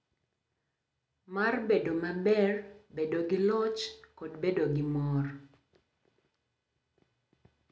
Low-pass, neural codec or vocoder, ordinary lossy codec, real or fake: none; none; none; real